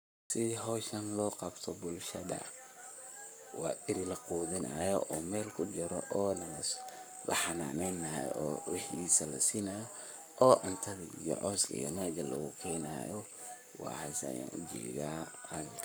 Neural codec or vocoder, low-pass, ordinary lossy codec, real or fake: codec, 44.1 kHz, 7.8 kbps, Pupu-Codec; none; none; fake